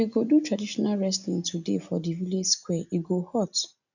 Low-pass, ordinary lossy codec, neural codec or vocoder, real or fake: 7.2 kHz; none; none; real